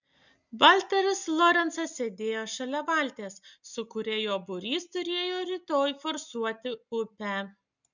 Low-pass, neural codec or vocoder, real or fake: 7.2 kHz; none; real